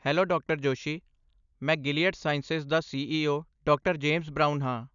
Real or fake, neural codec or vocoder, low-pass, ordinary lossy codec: real; none; 7.2 kHz; none